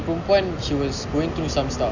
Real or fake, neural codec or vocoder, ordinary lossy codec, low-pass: real; none; none; 7.2 kHz